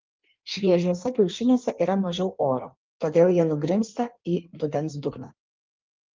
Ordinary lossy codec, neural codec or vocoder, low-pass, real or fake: Opus, 32 kbps; codec, 16 kHz in and 24 kHz out, 1.1 kbps, FireRedTTS-2 codec; 7.2 kHz; fake